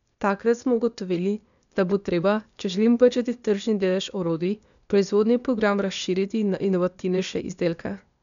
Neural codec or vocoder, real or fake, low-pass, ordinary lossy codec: codec, 16 kHz, 0.8 kbps, ZipCodec; fake; 7.2 kHz; none